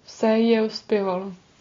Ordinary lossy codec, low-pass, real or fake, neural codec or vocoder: AAC, 32 kbps; 7.2 kHz; real; none